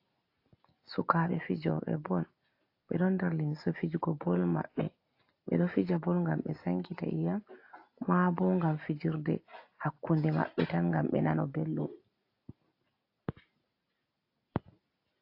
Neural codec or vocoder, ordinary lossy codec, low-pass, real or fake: none; AAC, 32 kbps; 5.4 kHz; real